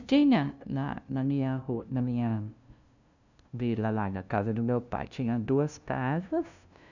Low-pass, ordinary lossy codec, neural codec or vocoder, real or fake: 7.2 kHz; none; codec, 16 kHz, 0.5 kbps, FunCodec, trained on LibriTTS, 25 frames a second; fake